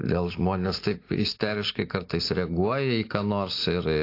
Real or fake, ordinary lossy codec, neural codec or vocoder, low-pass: real; AAC, 32 kbps; none; 5.4 kHz